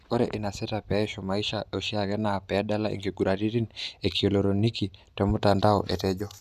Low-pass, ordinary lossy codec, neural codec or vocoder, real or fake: 14.4 kHz; none; none; real